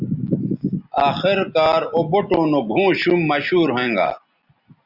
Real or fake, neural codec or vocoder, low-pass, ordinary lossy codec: real; none; 5.4 kHz; Opus, 64 kbps